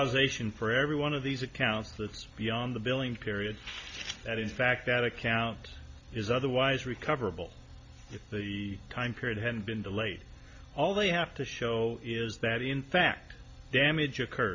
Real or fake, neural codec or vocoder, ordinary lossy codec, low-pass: real; none; MP3, 48 kbps; 7.2 kHz